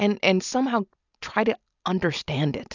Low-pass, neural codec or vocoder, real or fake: 7.2 kHz; none; real